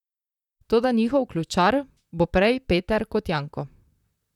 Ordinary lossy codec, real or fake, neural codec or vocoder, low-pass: none; fake; vocoder, 44.1 kHz, 128 mel bands, Pupu-Vocoder; 19.8 kHz